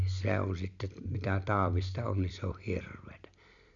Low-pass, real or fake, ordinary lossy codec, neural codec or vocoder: 7.2 kHz; real; none; none